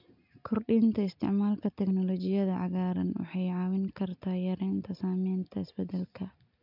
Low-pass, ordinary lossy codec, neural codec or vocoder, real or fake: 5.4 kHz; MP3, 48 kbps; none; real